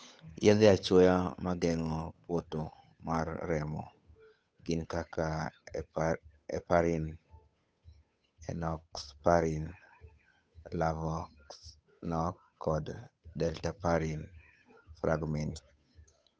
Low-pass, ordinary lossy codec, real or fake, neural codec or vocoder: none; none; fake; codec, 16 kHz, 8 kbps, FunCodec, trained on Chinese and English, 25 frames a second